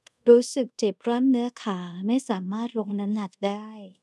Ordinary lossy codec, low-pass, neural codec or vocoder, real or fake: none; none; codec, 24 kHz, 0.5 kbps, DualCodec; fake